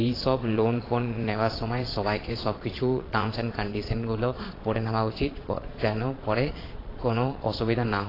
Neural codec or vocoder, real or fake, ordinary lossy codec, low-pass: vocoder, 22.05 kHz, 80 mel bands, Vocos; fake; AAC, 24 kbps; 5.4 kHz